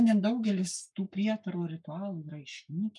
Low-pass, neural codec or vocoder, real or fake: 10.8 kHz; codec, 44.1 kHz, 7.8 kbps, Pupu-Codec; fake